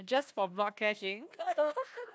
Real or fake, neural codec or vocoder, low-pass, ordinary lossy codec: fake; codec, 16 kHz, 1 kbps, FunCodec, trained on Chinese and English, 50 frames a second; none; none